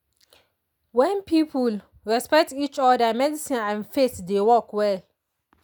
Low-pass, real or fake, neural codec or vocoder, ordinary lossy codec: none; real; none; none